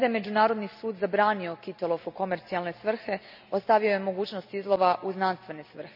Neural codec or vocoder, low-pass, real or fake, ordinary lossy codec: none; 5.4 kHz; real; none